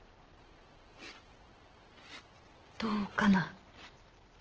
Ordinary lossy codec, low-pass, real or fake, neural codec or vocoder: Opus, 16 kbps; 7.2 kHz; real; none